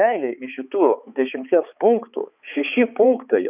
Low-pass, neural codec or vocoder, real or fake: 3.6 kHz; codec, 16 kHz, 4 kbps, X-Codec, HuBERT features, trained on balanced general audio; fake